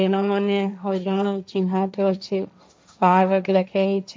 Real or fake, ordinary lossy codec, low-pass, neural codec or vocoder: fake; none; none; codec, 16 kHz, 1.1 kbps, Voila-Tokenizer